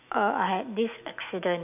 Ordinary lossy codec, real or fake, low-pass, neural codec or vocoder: none; fake; 3.6 kHz; autoencoder, 48 kHz, 128 numbers a frame, DAC-VAE, trained on Japanese speech